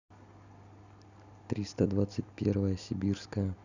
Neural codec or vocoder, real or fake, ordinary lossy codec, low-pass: none; real; none; 7.2 kHz